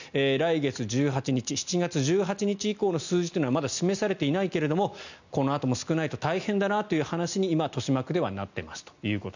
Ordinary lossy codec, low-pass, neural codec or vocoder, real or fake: none; 7.2 kHz; none; real